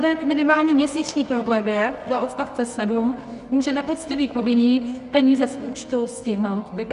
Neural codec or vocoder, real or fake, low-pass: codec, 24 kHz, 0.9 kbps, WavTokenizer, medium music audio release; fake; 10.8 kHz